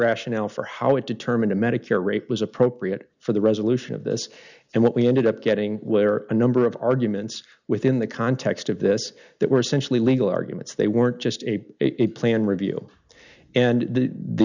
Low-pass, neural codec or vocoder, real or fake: 7.2 kHz; none; real